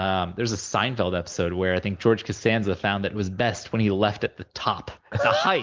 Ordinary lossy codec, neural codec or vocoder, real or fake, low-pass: Opus, 24 kbps; none; real; 7.2 kHz